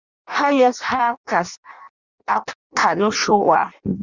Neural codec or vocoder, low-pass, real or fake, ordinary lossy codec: codec, 16 kHz in and 24 kHz out, 0.6 kbps, FireRedTTS-2 codec; 7.2 kHz; fake; Opus, 64 kbps